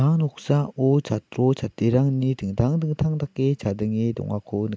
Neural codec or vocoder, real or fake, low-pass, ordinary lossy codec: none; real; none; none